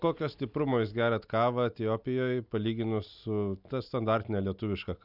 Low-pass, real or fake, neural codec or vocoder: 5.4 kHz; real; none